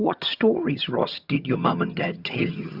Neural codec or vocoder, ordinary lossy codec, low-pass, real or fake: vocoder, 22.05 kHz, 80 mel bands, HiFi-GAN; Opus, 64 kbps; 5.4 kHz; fake